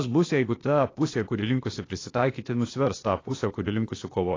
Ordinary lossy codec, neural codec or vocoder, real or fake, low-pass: AAC, 32 kbps; codec, 16 kHz, 0.8 kbps, ZipCodec; fake; 7.2 kHz